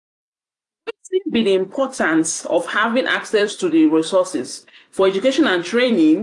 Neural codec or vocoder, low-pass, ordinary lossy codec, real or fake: vocoder, 44.1 kHz, 128 mel bands every 256 samples, BigVGAN v2; 10.8 kHz; AAC, 64 kbps; fake